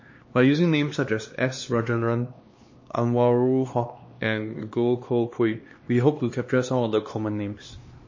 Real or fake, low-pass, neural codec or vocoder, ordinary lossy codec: fake; 7.2 kHz; codec, 16 kHz, 2 kbps, X-Codec, HuBERT features, trained on LibriSpeech; MP3, 32 kbps